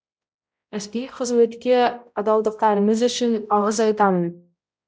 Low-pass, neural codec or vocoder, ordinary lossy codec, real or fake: none; codec, 16 kHz, 0.5 kbps, X-Codec, HuBERT features, trained on balanced general audio; none; fake